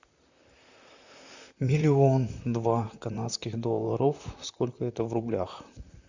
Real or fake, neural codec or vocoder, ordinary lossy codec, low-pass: fake; vocoder, 44.1 kHz, 128 mel bands, Pupu-Vocoder; Opus, 64 kbps; 7.2 kHz